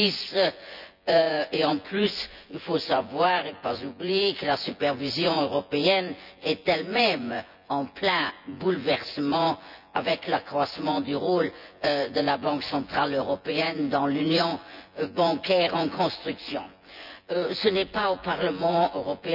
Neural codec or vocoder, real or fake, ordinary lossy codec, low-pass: vocoder, 24 kHz, 100 mel bands, Vocos; fake; none; 5.4 kHz